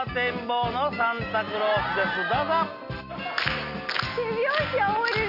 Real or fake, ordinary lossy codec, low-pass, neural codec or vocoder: real; Opus, 64 kbps; 5.4 kHz; none